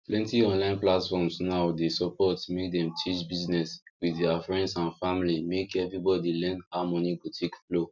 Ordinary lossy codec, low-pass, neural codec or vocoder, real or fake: none; 7.2 kHz; none; real